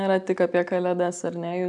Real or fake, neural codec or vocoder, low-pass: real; none; 10.8 kHz